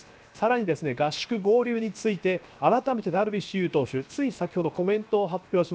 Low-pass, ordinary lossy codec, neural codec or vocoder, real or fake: none; none; codec, 16 kHz, 0.7 kbps, FocalCodec; fake